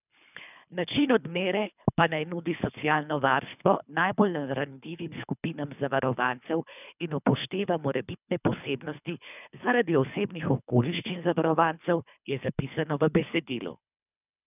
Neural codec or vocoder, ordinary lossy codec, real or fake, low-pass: codec, 24 kHz, 3 kbps, HILCodec; none; fake; 3.6 kHz